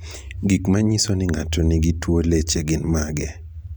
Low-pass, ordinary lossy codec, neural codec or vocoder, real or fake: none; none; none; real